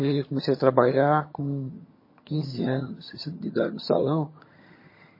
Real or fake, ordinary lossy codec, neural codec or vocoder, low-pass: fake; MP3, 24 kbps; vocoder, 22.05 kHz, 80 mel bands, HiFi-GAN; 5.4 kHz